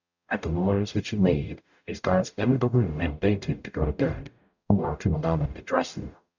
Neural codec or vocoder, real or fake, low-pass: codec, 44.1 kHz, 0.9 kbps, DAC; fake; 7.2 kHz